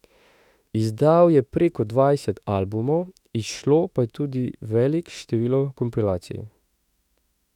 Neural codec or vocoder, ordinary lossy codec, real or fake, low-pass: autoencoder, 48 kHz, 32 numbers a frame, DAC-VAE, trained on Japanese speech; none; fake; 19.8 kHz